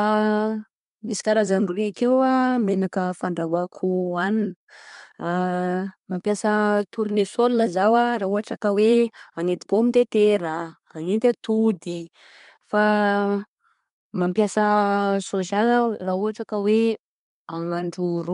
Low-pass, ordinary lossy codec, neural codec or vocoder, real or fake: 10.8 kHz; MP3, 64 kbps; codec, 24 kHz, 1 kbps, SNAC; fake